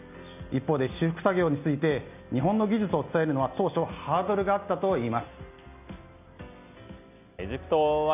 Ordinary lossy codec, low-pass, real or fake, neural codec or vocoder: none; 3.6 kHz; real; none